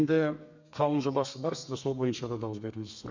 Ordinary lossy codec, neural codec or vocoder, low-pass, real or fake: MP3, 64 kbps; codec, 32 kHz, 1.9 kbps, SNAC; 7.2 kHz; fake